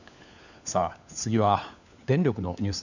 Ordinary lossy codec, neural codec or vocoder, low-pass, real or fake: none; codec, 16 kHz, 4 kbps, FunCodec, trained on LibriTTS, 50 frames a second; 7.2 kHz; fake